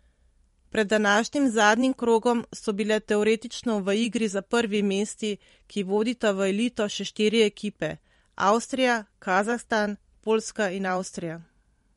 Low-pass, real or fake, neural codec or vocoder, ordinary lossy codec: 19.8 kHz; fake; vocoder, 44.1 kHz, 128 mel bands every 512 samples, BigVGAN v2; MP3, 48 kbps